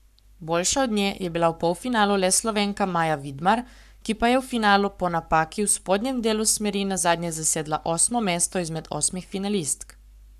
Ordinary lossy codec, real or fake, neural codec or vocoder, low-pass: none; fake; codec, 44.1 kHz, 7.8 kbps, Pupu-Codec; 14.4 kHz